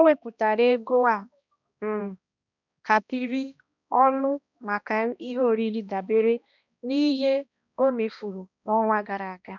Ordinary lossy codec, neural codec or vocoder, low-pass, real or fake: none; codec, 16 kHz, 1 kbps, X-Codec, HuBERT features, trained on balanced general audio; 7.2 kHz; fake